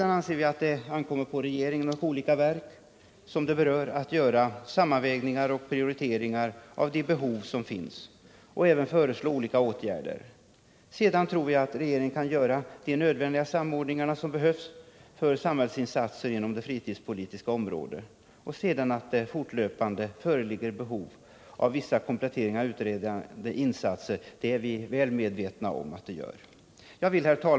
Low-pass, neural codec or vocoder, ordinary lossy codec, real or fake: none; none; none; real